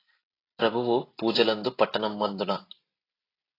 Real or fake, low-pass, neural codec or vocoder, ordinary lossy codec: real; 5.4 kHz; none; AAC, 24 kbps